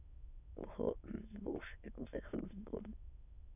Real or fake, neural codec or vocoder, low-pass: fake; autoencoder, 22.05 kHz, a latent of 192 numbers a frame, VITS, trained on many speakers; 3.6 kHz